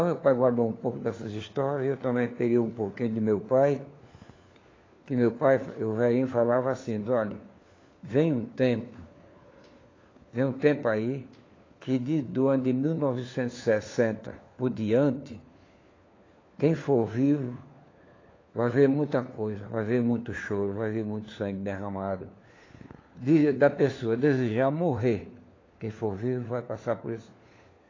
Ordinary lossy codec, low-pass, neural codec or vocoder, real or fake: AAC, 32 kbps; 7.2 kHz; codec, 16 kHz, 4 kbps, FunCodec, trained on LibriTTS, 50 frames a second; fake